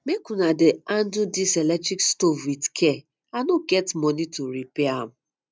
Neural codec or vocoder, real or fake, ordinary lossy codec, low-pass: none; real; none; none